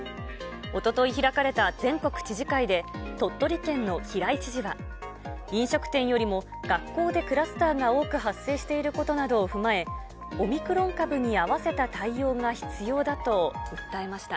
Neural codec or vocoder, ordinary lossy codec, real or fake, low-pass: none; none; real; none